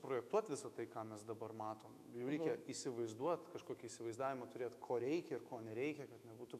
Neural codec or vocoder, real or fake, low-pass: autoencoder, 48 kHz, 128 numbers a frame, DAC-VAE, trained on Japanese speech; fake; 14.4 kHz